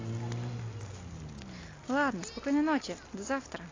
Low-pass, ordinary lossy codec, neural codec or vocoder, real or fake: 7.2 kHz; AAC, 32 kbps; none; real